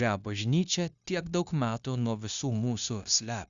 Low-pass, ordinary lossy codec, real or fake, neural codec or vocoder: 7.2 kHz; Opus, 64 kbps; fake; codec, 16 kHz, 0.9 kbps, LongCat-Audio-Codec